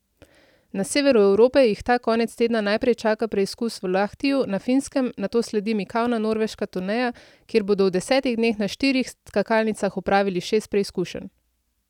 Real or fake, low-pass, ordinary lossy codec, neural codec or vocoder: real; 19.8 kHz; none; none